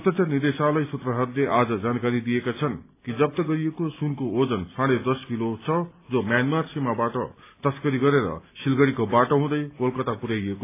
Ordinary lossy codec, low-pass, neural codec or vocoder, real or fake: AAC, 24 kbps; 3.6 kHz; none; real